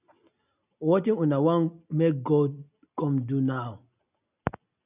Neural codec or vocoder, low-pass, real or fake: none; 3.6 kHz; real